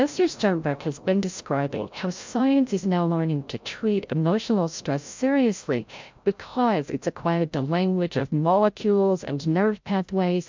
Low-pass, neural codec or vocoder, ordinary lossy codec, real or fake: 7.2 kHz; codec, 16 kHz, 0.5 kbps, FreqCodec, larger model; MP3, 64 kbps; fake